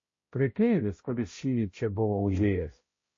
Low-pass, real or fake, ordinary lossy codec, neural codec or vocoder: 7.2 kHz; fake; MP3, 32 kbps; codec, 16 kHz, 0.5 kbps, X-Codec, HuBERT features, trained on balanced general audio